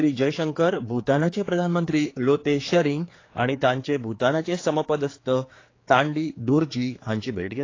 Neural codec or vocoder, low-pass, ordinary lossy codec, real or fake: codec, 16 kHz, 4 kbps, X-Codec, HuBERT features, trained on general audio; 7.2 kHz; AAC, 32 kbps; fake